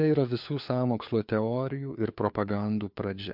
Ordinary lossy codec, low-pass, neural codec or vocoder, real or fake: MP3, 48 kbps; 5.4 kHz; codec, 16 kHz, 4 kbps, FunCodec, trained on LibriTTS, 50 frames a second; fake